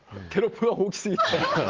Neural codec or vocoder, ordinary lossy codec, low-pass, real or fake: none; Opus, 16 kbps; 7.2 kHz; real